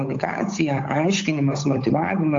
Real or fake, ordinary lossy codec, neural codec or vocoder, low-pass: fake; AAC, 48 kbps; codec, 16 kHz, 8 kbps, FunCodec, trained on Chinese and English, 25 frames a second; 7.2 kHz